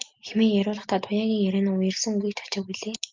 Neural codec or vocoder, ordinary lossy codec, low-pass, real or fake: none; Opus, 32 kbps; 7.2 kHz; real